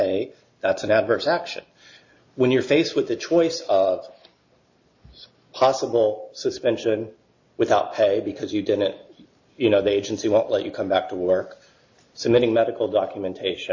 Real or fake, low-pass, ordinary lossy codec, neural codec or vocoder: real; 7.2 kHz; AAC, 48 kbps; none